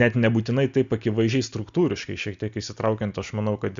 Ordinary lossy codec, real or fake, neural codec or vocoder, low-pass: Opus, 64 kbps; real; none; 7.2 kHz